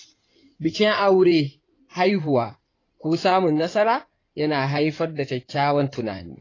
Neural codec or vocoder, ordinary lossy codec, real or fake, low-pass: codec, 16 kHz in and 24 kHz out, 2.2 kbps, FireRedTTS-2 codec; AAC, 32 kbps; fake; 7.2 kHz